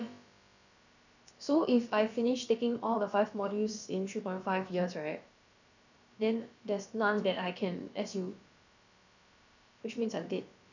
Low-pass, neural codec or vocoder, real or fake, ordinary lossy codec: 7.2 kHz; codec, 16 kHz, about 1 kbps, DyCAST, with the encoder's durations; fake; none